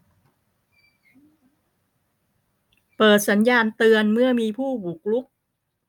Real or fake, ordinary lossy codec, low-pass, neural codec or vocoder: real; none; 19.8 kHz; none